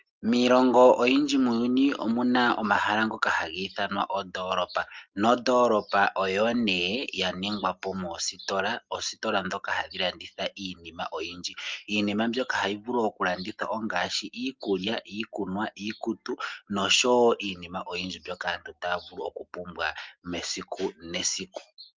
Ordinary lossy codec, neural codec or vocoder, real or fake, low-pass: Opus, 32 kbps; none; real; 7.2 kHz